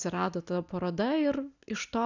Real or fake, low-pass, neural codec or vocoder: real; 7.2 kHz; none